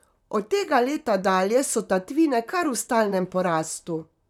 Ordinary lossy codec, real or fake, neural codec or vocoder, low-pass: none; fake; vocoder, 44.1 kHz, 128 mel bands, Pupu-Vocoder; 19.8 kHz